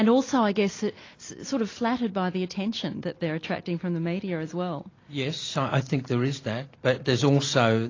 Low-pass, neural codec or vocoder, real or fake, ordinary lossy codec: 7.2 kHz; none; real; AAC, 32 kbps